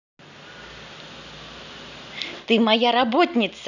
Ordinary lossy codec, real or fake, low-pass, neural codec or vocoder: none; real; 7.2 kHz; none